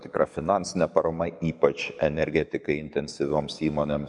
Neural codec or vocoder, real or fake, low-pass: codec, 44.1 kHz, 7.8 kbps, DAC; fake; 10.8 kHz